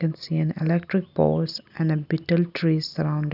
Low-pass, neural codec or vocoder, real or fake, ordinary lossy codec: 5.4 kHz; none; real; none